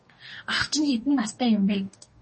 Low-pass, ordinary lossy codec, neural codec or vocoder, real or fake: 10.8 kHz; MP3, 32 kbps; codec, 44.1 kHz, 2.6 kbps, DAC; fake